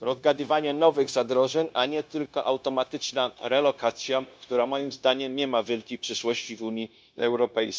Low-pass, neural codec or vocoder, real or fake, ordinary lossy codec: none; codec, 16 kHz, 0.9 kbps, LongCat-Audio-Codec; fake; none